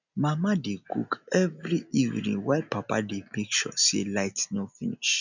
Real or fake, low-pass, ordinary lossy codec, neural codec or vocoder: real; 7.2 kHz; none; none